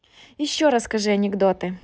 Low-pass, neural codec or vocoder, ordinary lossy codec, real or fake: none; none; none; real